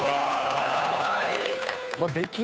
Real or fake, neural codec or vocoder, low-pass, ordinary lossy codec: fake; codec, 16 kHz, 2 kbps, FunCodec, trained on Chinese and English, 25 frames a second; none; none